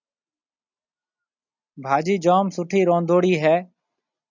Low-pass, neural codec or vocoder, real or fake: 7.2 kHz; none; real